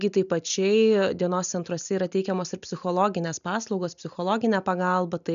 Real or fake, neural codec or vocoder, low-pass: real; none; 7.2 kHz